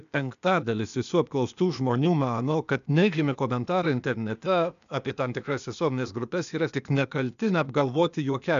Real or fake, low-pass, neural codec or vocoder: fake; 7.2 kHz; codec, 16 kHz, 0.8 kbps, ZipCodec